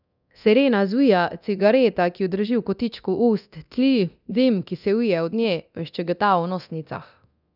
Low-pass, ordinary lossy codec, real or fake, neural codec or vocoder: 5.4 kHz; none; fake; codec, 24 kHz, 0.9 kbps, DualCodec